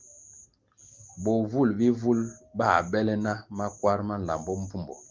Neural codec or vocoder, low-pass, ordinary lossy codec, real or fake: none; 7.2 kHz; Opus, 32 kbps; real